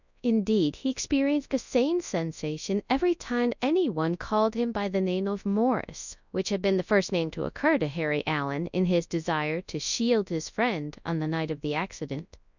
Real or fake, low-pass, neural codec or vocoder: fake; 7.2 kHz; codec, 24 kHz, 0.9 kbps, WavTokenizer, large speech release